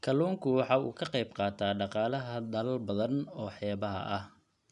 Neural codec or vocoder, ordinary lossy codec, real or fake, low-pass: none; none; real; 10.8 kHz